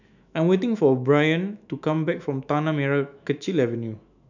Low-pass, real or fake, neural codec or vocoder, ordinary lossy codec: 7.2 kHz; fake; autoencoder, 48 kHz, 128 numbers a frame, DAC-VAE, trained on Japanese speech; none